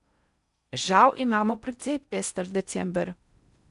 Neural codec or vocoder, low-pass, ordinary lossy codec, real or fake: codec, 16 kHz in and 24 kHz out, 0.6 kbps, FocalCodec, streaming, 4096 codes; 10.8 kHz; none; fake